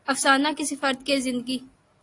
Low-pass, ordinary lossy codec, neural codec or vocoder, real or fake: 10.8 kHz; AAC, 48 kbps; none; real